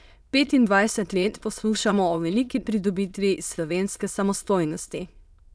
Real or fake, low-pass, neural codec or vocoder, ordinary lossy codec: fake; none; autoencoder, 22.05 kHz, a latent of 192 numbers a frame, VITS, trained on many speakers; none